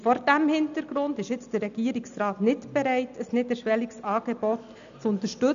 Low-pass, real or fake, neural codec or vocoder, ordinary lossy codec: 7.2 kHz; real; none; none